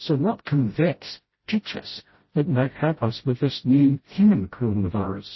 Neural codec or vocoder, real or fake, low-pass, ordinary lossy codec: codec, 16 kHz, 0.5 kbps, FreqCodec, smaller model; fake; 7.2 kHz; MP3, 24 kbps